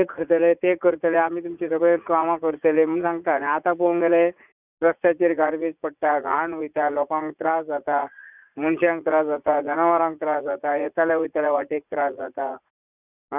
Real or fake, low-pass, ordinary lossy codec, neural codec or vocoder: fake; 3.6 kHz; none; vocoder, 44.1 kHz, 80 mel bands, Vocos